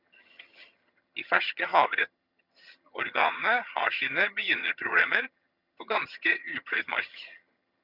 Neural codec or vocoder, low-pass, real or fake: vocoder, 22.05 kHz, 80 mel bands, HiFi-GAN; 5.4 kHz; fake